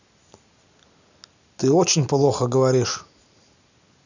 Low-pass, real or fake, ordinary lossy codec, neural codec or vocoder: 7.2 kHz; real; none; none